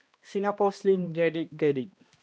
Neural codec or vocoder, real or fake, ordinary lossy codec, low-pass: codec, 16 kHz, 1 kbps, X-Codec, HuBERT features, trained on balanced general audio; fake; none; none